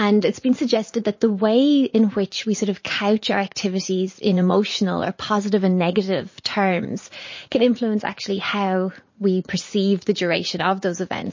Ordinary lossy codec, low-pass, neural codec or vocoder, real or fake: MP3, 32 kbps; 7.2 kHz; vocoder, 44.1 kHz, 80 mel bands, Vocos; fake